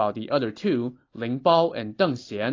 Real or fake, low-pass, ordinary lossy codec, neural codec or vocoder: real; 7.2 kHz; AAC, 32 kbps; none